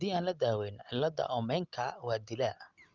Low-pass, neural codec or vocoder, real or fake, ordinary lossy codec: 7.2 kHz; none; real; Opus, 32 kbps